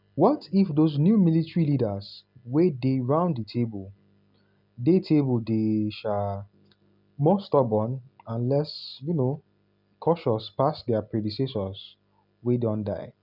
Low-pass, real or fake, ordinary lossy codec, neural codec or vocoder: 5.4 kHz; real; none; none